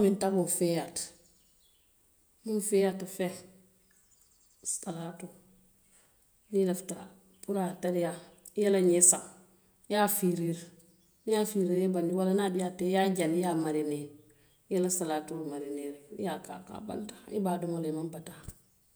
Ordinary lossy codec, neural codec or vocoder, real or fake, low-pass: none; vocoder, 48 kHz, 128 mel bands, Vocos; fake; none